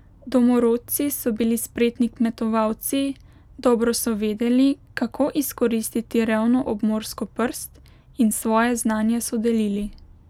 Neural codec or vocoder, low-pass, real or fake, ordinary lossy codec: none; 19.8 kHz; real; none